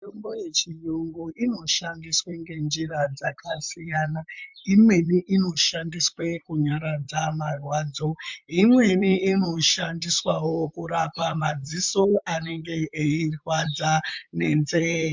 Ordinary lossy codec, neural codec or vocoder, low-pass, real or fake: MP3, 64 kbps; vocoder, 44.1 kHz, 128 mel bands, Pupu-Vocoder; 7.2 kHz; fake